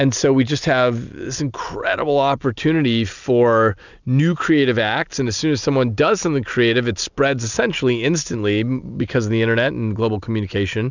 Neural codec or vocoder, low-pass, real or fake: none; 7.2 kHz; real